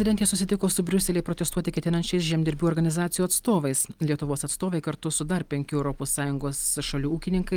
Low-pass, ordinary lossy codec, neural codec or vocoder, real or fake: 19.8 kHz; Opus, 32 kbps; none; real